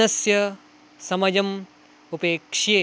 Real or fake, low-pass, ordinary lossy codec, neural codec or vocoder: real; none; none; none